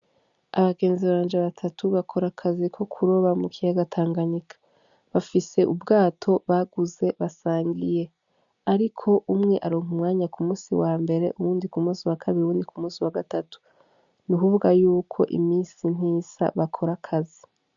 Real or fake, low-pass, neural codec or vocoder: real; 7.2 kHz; none